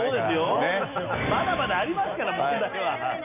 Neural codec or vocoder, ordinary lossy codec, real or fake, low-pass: none; none; real; 3.6 kHz